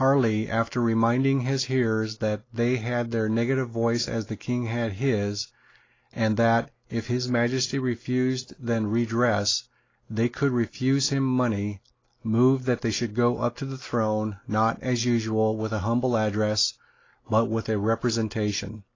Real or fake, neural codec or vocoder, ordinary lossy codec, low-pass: real; none; AAC, 32 kbps; 7.2 kHz